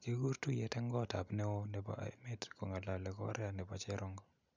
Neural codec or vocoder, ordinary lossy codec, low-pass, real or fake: none; none; 7.2 kHz; real